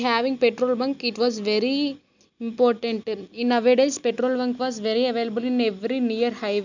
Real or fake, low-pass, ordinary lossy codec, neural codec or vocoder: real; 7.2 kHz; none; none